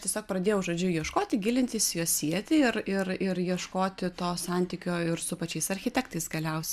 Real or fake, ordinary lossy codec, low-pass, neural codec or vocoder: real; MP3, 96 kbps; 14.4 kHz; none